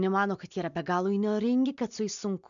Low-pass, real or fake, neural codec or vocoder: 7.2 kHz; real; none